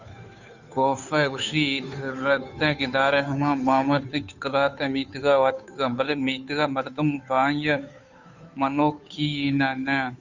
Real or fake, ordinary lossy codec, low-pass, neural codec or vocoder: fake; Opus, 64 kbps; 7.2 kHz; codec, 16 kHz, 2 kbps, FunCodec, trained on Chinese and English, 25 frames a second